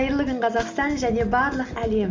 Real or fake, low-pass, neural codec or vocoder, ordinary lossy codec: real; 7.2 kHz; none; Opus, 32 kbps